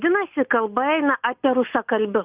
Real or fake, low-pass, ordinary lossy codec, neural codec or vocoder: real; 3.6 kHz; Opus, 24 kbps; none